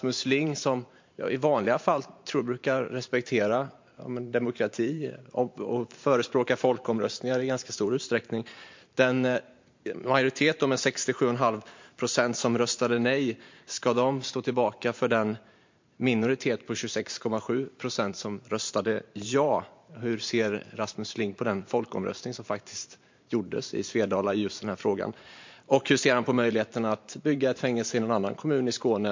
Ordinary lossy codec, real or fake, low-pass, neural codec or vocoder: MP3, 48 kbps; fake; 7.2 kHz; vocoder, 44.1 kHz, 128 mel bands every 512 samples, BigVGAN v2